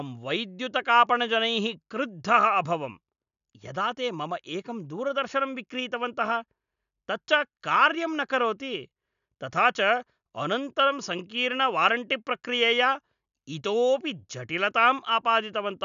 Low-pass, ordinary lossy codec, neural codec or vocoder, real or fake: 7.2 kHz; none; none; real